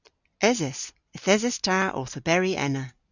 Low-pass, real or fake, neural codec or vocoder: 7.2 kHz; real; none